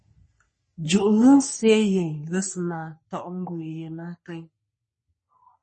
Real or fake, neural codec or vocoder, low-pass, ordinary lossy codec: fake; codec, 44.1 kHz, 3.4 kbps, Pupu-Codec; 10.8 kHz; MP3, 32 kbps